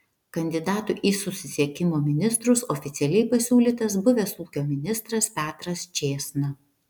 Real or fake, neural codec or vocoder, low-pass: real; none; 19.8 kHz